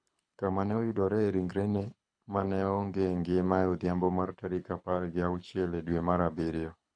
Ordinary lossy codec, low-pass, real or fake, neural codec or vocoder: none; 9.9 kHz; fake; codec, 24 kHz, 6 kbps, HILCodec